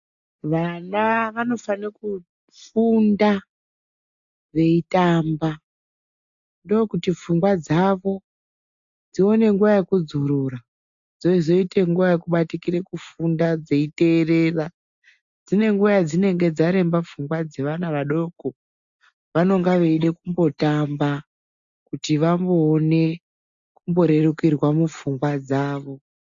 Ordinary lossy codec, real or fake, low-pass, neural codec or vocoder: AAC, 64 kbps; real; 7.2 kHz; none